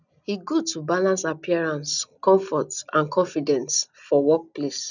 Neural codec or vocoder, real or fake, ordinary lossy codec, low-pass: none; real; none; 7.2 kHz